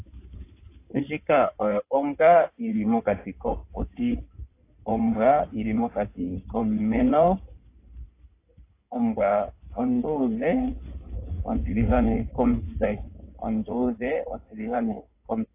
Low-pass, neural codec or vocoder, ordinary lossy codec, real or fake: 3.6 kHz; codec, 16 kHz in and 24 kHz out, 2.2 kbps, FireRedTTS-2 codec; AAC, 24 kbps; fake